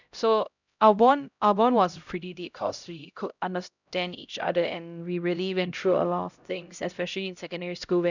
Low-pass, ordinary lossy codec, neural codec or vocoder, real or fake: 7.2 kHz; none; codec, 16 kHz, 0.5 kbps, X-Codec, HuBERT features, trained on LibriSpeech; fake